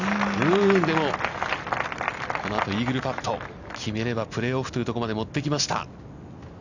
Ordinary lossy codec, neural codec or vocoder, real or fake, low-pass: none; none; real; 7.2 kHz